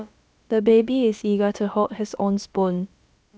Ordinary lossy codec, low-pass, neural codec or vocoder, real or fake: none; none; codec, 16 kHz, about 1 kbps, DyCAST, with the encoder's durations; fake